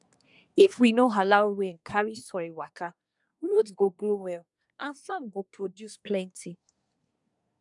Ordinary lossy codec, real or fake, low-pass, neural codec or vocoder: none; fake; 10.8 kHz; codec, 24 kHz, 1 kbps, SNAC